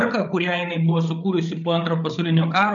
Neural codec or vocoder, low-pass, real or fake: codec, 16 kHz, 8 kbps, FreqCodec, larger model; 7.2 kHz; fake